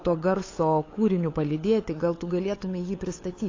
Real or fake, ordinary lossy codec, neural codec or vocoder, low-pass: fake; AAC, 32 kbps; codec, 16 kHz, 8 kbps, FunCodec, trained on LibriTTS, 25 frames a second; 7.2 kHz